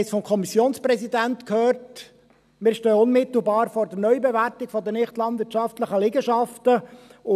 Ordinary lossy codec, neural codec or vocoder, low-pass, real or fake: none; none; 14.4 kHz; real